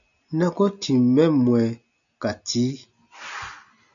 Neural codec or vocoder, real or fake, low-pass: none; real; 7.2 kHz